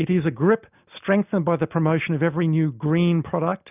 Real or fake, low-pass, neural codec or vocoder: real; 3.6 kHz; none